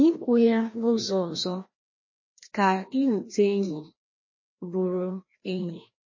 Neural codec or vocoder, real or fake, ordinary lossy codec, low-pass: codec, 16 kHz, 1 kbps, FreqCodec, larger model; fake; MP3, 32 kbps; 7.2 kHz